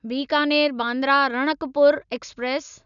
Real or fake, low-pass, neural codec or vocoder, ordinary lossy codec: real; 7.2 kHz; none; none